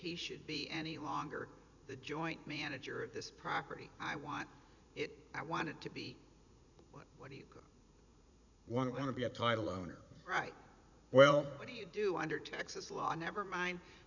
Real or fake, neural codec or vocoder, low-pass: fake; vocoder, 44.1 kHz, 80 mel bands, Vocos; 7.2 kHz